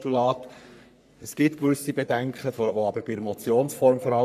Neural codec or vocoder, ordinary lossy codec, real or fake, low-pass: codec, 44.1 kHz, 3.4 kbps, Pupu-Codec; none; fake; 14.4 kHz